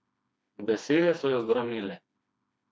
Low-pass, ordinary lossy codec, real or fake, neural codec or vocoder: none; none; fake; codec, 16 kHz, 2 kbps, FreqCodec, smaller model